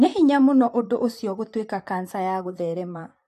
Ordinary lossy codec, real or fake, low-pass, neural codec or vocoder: AAC, 64 kbps; fake; 14.4 kHz; vocoder, 44.1 kHz, 128 mel bands, Pupu-Vocoder